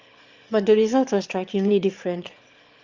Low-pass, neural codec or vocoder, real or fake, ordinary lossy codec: 7.2 kHz; autoencoder, 22.05 kHz, a latent of 192 numbers a frame, VITS, trained on one speaker; fake; Opus, 32 kbps